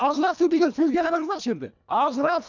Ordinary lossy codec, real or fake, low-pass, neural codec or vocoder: none; fake; 7.2 kHz; codec, 24 kHz, 1.5 kbps, HILCodec